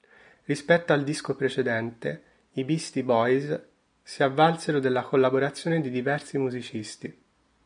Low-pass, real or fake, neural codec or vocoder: 9.9 kHz; real; none